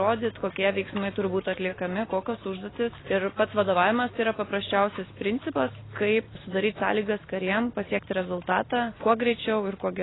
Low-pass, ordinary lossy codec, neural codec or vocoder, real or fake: 7.2 kHz; AAC, 16 kbps; none; real